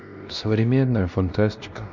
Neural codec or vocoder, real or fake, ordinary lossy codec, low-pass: codec, 16 kHz, 1 kbps, X-Codec, WavLM features, trained on Multilingual LibriSpeech; fake; none; 7.2 kHz